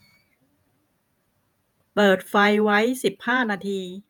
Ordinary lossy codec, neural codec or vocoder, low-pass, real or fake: none; vocoder, 48 kHz, 128 mel bands, Vocos; none; fake